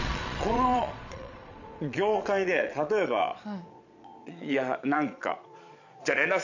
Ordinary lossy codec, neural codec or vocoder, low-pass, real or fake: none; vocoder, 22.05 kHz, 80 mel bands, Vocos; 7.2 kHz; fake